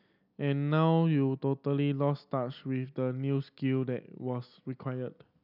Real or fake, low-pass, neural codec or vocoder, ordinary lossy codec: real; 5.4 kHz; none; none